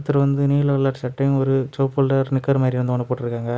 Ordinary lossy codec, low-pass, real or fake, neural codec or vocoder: none; none; real; none